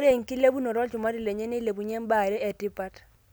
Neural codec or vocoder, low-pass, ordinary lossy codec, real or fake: none; none; none; real